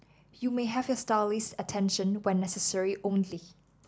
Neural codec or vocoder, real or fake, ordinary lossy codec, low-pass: none; real; none; none